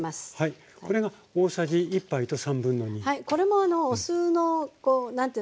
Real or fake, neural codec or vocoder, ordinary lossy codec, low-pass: real; none; none; none